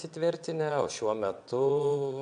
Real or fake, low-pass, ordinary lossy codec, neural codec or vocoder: fake; 9.9 kHz; AAC, 96 kbps; vocoder, 22.05 kHz, 80 mel bands, WaveNeXt